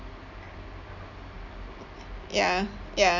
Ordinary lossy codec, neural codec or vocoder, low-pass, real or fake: none; none; 7.2 kHz; real